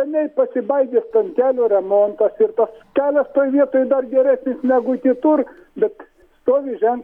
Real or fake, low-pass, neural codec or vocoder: real; 19.8 kHz; none